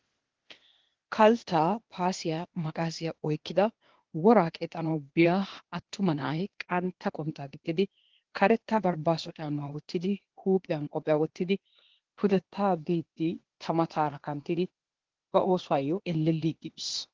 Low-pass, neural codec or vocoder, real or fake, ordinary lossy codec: 7.2 kHz; codec, 16 kHz, 0.8 kbps, ZipCodec; fake; Opus, 16 kbps